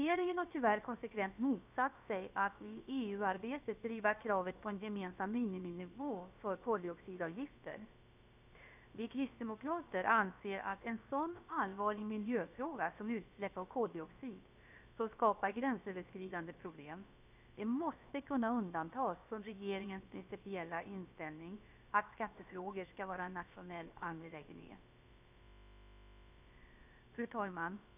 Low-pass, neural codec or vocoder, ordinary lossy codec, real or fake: 3.6 kHz; codec, 16 kHz, about 1 kbps, DyCAST, with the encoder's durations; none; fake